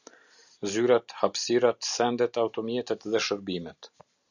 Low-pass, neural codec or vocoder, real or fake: 7.2 kHz; none; real